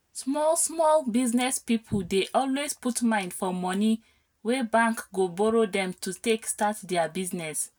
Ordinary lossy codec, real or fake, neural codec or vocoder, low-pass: none; real; none; none